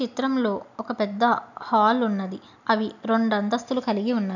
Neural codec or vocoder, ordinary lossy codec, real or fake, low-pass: none; none; real; 7.2 kHz